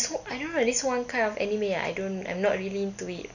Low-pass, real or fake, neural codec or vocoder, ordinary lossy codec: 7.2 kHz; real; none; none